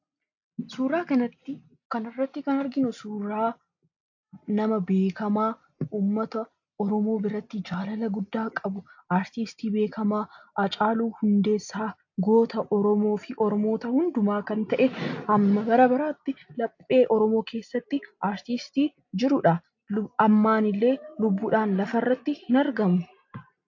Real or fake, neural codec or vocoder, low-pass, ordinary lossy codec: real; none; 7.2 kHz; AAC, 48 kbps